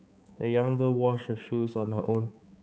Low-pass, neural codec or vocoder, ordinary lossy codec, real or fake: none; codec, 16 kHz, 4 kbps, X-Codec, HuBERT features, trained on balanced general audio; none; fake